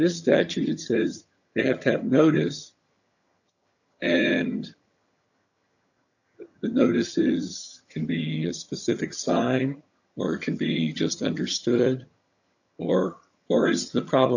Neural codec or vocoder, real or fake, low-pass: vocoder, 22.05 kHz, 80 mel bands, HiFi-GAN; fake; 7.2 kHz